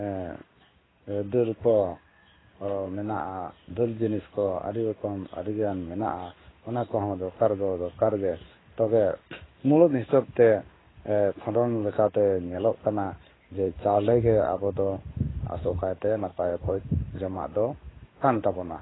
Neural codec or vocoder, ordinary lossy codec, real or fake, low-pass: codec, 16 kHz in and 24 kHz out, 1 kbps, XY-Tokenizer; AAC, 16 kbps; fake; 7.2 kHz